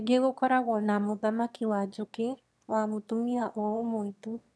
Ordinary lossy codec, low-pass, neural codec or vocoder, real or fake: none; none; autoencoder, 22.05 kHz, a latent of 192 numbers a frame, VITS, trained on one speaker; fake